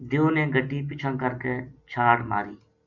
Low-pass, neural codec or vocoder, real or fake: 7.2 kHz; none; real